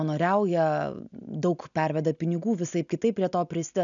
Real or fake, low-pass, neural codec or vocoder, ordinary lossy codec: real; 7.2 kHz; none; MP3, 96 kbps